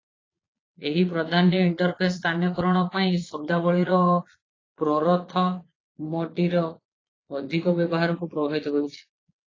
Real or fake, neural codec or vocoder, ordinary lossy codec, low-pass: fake; vocoder, 22.05 kHz, 80 mel bands, Vocos; AAC, 32 kbps; 7.2 kHz